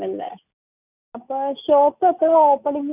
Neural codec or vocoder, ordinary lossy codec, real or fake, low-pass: none; none; real; 3.6 kHz